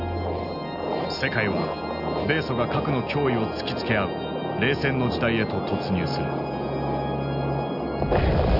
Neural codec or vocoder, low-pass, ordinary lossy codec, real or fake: none; 5.4 kHz; none; real